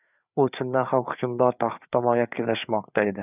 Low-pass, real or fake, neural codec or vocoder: 3.6 kHz; fake; codec, 16 kHz, 4 kbps, FreqCodec, larger model